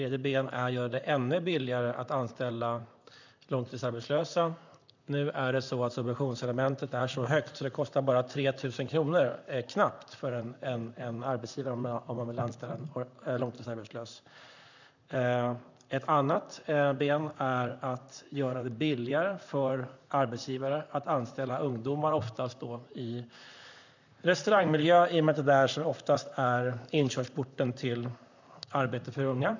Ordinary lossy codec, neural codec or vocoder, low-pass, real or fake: none; vocoder, 44.1 kHz, 128 mel bands, Pupu-Vocoder; 7.2 kHz; fake